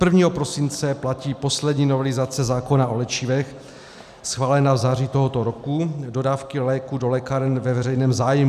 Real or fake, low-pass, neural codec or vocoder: real; 14.4 kHz; none